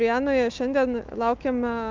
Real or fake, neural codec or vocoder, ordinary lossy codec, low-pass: fake; autoencoder, 48 kHz, 128 numbers a frame, DAC-VAE, trained on Japanese speech; Opus, 32 kbps; 7.2 kHz